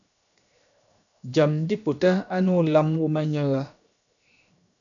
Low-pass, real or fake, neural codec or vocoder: 7.2 kHz; fake; codec, 16 kHz, 0.7 kbps, FocalCodec